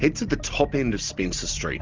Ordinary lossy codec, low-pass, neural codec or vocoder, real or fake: Opus, 32 kbps; 7.2 kHz; none; real